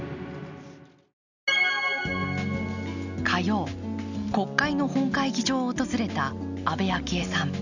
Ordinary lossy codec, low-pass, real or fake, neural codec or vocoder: none; 7.2 kHz; real; none